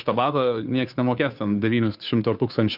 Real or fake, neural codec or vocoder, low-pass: fake; codec, 16 kHz, 2 kbps, FunCodec, trained on Chinese and English, 25 frames a second; 5.4 kHz